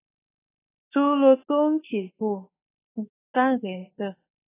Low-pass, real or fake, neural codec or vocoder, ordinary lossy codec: 3.6 kHz; fake; autoencoder, 48 kHz, 32 numbers a frame, DAC-VAE, trained on Japanese speech; AAC, 16 kbps